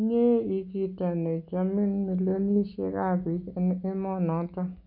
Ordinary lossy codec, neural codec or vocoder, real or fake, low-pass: none; none; real; 5.4 kHz